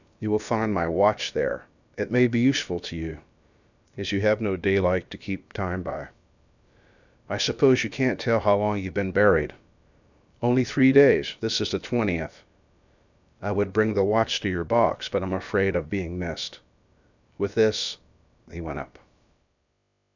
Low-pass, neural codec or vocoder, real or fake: 7.2 kHz; codec, 16 kHz, about 1 kbps, DyCAST, with the encoder's durations; fake